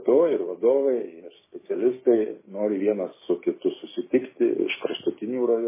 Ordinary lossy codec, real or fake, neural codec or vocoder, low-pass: MP3, 16 kbps; fake; codec, 16 kHz, 6 kbps, DAC; 3.6 kHz